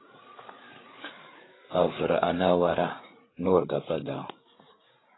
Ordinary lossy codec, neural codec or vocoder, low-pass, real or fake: AAC, 16 kbps; codec, 16 kHz, 8 kbps, FreqCodec, larger model; 7.2 kHz; fake